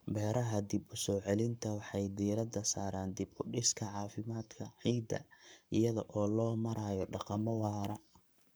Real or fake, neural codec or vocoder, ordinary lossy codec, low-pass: fake; codec, 44.1 kHz, 7.8 kbps, Pupu-Codec; none; none